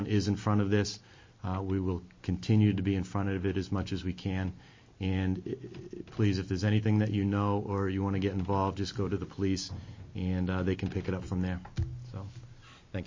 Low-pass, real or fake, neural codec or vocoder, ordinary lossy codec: 7.2 kHz; real; none; MP3, 32 kbps